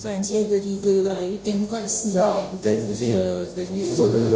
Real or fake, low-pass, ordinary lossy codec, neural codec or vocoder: fake; none; none; codec, 16 kHz, 0.5 kbps, FunCodec, trained on Chinese and English, 25 frames a second